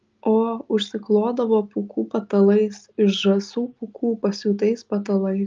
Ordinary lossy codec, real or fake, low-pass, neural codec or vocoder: Opus, 24 kbps; real; 7.2 kHz; none